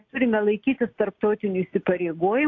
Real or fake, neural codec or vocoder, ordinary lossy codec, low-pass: real; none; AAC, 48 kbps; 7.2 kHz